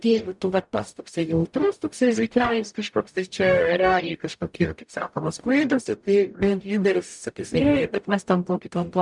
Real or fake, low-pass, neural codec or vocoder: fake; 10.8 kHz; codec, 44.1 kHz, 0.9 kbps, DAC